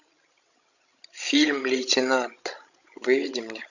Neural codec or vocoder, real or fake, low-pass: codec, 16 kHz, 16 kbps, FreqCodec, larger model; fake; 7.2 kHz